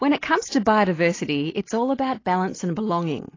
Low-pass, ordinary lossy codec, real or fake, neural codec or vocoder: 7.2 kHz; AAC, 32 kbps; real; none